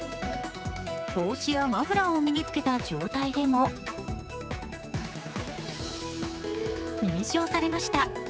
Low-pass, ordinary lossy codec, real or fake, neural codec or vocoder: none; none; fake; codec, 16 kHz, 4 kbps, X-Codec, HuBERT features, trained on general audio